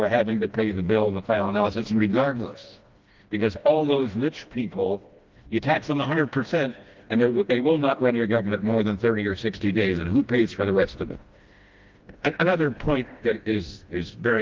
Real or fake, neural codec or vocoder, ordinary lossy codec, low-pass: fake; codec, 16 kHz, 1 kbps, FreqCodec, smaller model; Opus, 32 kbps; 7.2 kHz